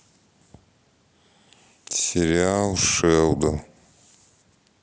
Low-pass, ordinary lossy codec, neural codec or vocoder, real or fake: none; none; none; real